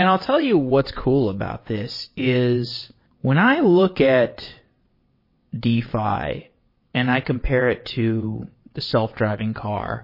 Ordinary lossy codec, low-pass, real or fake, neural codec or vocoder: MP3, 24 kbps; 5.4 kHz; fake; vocoder, 22.05 kHz, 80 mel bands, WaveNeXt